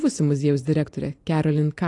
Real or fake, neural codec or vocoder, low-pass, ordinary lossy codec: real; none; 10.8 kHz; AAC, 48 kbps